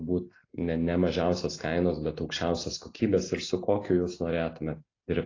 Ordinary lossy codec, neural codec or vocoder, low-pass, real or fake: AAC, 32 kbps; none; 7.2 kHz; real